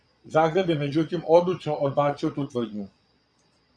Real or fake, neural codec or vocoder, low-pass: fake; vocoder, 22.05 kHz, 80 mel bands, Vocos; 9.9 kHz